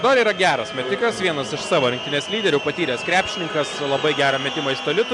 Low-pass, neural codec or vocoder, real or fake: 10.8 kHz; none; real